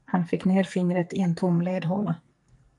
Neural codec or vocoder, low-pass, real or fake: codec, 44.1 kHz, 2.6 kbps, SNAC; 10.8 kHz; fake